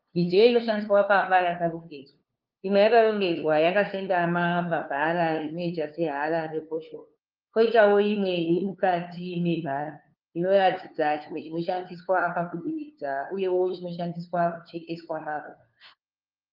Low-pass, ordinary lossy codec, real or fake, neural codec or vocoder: 5.4 kHz; Opus, 32 kbps; fake; codec, 16 kHz, 2 kbps, FunCodec, trained on LibriTTS, 25 frames a second